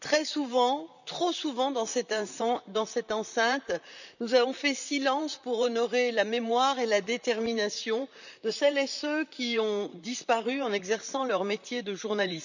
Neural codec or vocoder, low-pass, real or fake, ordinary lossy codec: vocoder, 44.1 kHz, 128 mel bands, Pupu-Vocoder; 7.2 kHz; fake; none